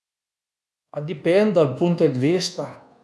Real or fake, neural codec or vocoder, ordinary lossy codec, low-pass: fake; codec, 24 kHz, 1.2 kbps, DualCodec; none; none